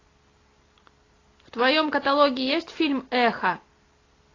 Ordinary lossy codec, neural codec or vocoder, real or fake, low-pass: AAC, 32 kbps; none; real; 7.2 kHz